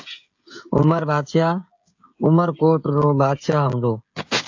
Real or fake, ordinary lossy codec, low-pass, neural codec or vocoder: fake; AAC, 48 kbps; 7.2 kHz; codec, 16 kHz, 16 kbps, FreqCodec, smaller model